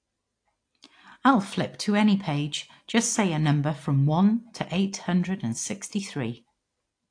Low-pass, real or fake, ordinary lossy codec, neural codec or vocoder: 9.9 kHz; real; AAC, 48 kbps; none